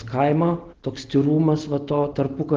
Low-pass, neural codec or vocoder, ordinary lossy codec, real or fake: 7.2 kHz; none; Opus, 16 kbps; real